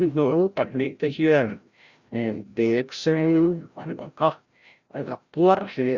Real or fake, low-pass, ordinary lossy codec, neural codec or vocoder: fake; 7.2 kHz; Opus, 64 kbps; codec, 16 kHz, 0.5 kbps, FreqCodec, larger model